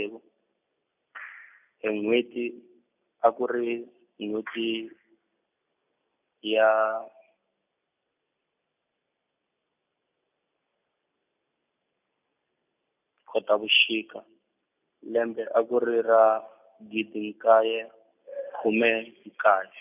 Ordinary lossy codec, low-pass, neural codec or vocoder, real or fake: none; 3.6 kHz; none; real